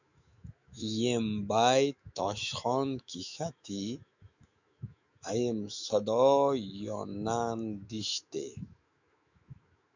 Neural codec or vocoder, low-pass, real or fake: autoencoder, 48 kHz, 128 numbers a frame, DAC-VAE, trained on Japanese speech; 7.2 kHz; fake